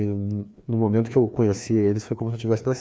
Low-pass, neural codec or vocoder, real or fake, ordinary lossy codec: none; codec, 16 kHz, 2 kbps, FreqCodec, larger model; fake; none